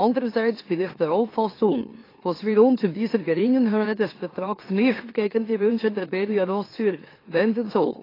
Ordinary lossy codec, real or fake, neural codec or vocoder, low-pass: AAC, 24 kbps; fake; autoencoder, 44.1 kHz, a latent of 192 numbers a frame, MeloTTS; 5.4 kHz